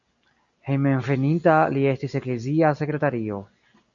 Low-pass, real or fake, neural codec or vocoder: 7.2 kHz; real; none